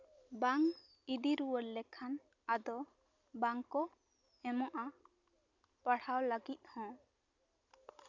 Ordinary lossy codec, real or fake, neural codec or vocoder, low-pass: none; real; none; 7.2 kHz